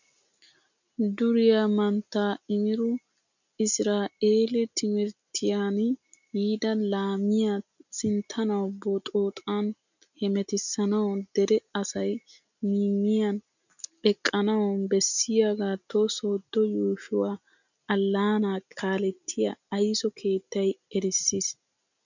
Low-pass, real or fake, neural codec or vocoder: 7.2 kHz; real; none